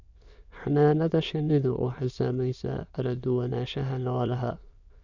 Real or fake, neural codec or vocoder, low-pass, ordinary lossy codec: fake; autoencoder, 22.05 kHz, a latent of 192 numbers a frame, VITS, trained on many speakers; 7.2 kHz; none